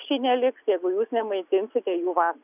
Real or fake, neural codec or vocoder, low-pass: real; none; 3.6 kHz